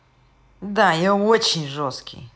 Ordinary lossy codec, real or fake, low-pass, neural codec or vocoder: none; real; none; none